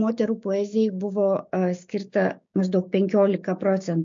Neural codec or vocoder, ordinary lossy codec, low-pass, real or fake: codec, 16 kHz, 6 kbps, DAC; MP3, 48 kbps; 7.2 kHz; fake